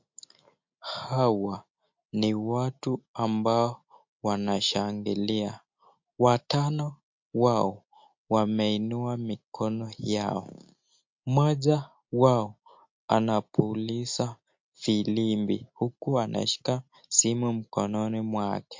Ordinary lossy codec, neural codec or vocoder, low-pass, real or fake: MP3, 48 kbps; none; 7.2 kHz; real